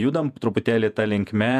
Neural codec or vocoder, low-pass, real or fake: vocoder, 48 kHz, 128 mel bands, Vocos; 14.4 kHz; fake